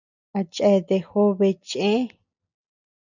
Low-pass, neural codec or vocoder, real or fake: 7.2 kHz; none; real